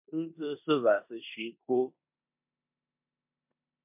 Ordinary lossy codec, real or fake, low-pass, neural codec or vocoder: MP3, 32 kbps; fake; 3.6 kHz; codec, 16 kHz in and 24 kHz out, 0.9 kbps, LongCat-Audio-Codec, four codebook decoder